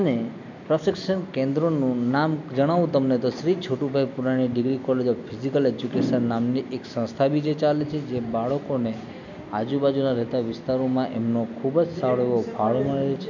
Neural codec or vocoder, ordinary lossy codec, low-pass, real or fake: none; none; 7.2 kHz; real